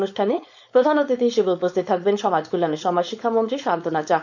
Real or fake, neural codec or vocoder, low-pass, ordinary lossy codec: fake; codec, 16 kHz, 4.8 kbps, FACodec; 7.2 kHz; none